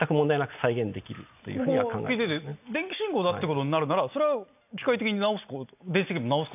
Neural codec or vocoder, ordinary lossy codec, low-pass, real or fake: none; none; 3.6 kHz; real